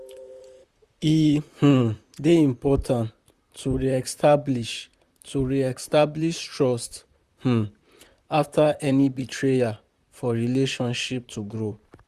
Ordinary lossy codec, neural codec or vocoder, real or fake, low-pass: Opus, 64 kbps; vocoder, 44.1 kHz, 128 mel bands, Pupu-Vocoder; fake; 14.4 kHz